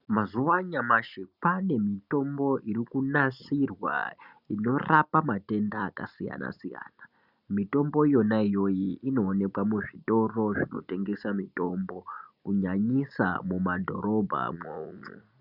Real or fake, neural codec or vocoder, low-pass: real; none; 5.4 kHz